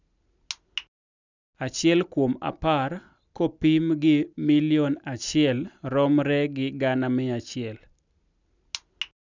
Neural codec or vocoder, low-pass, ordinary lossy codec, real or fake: none; 7.2 kHz; none; real